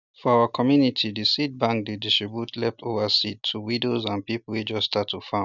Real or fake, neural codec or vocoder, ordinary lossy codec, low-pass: real; none; none; 7.2 kHz